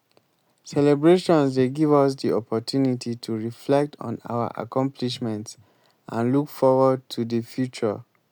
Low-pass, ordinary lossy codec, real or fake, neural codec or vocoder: none; none; real; none